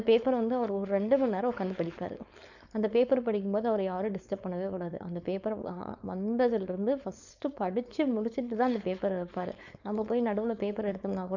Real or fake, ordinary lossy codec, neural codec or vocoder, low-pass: fake; none; codec, 16 kHz, 4.8 kbps, FACodec; 7.2 kHz